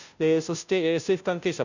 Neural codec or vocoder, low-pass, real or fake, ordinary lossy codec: codec, 16 kHz, 0.5 kbps, FunCodec, trained on Chinese and English, 25 frames a second; 7.2 kHz; fake; none